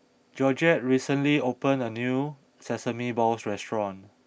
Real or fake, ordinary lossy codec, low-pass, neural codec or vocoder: real; none; none; none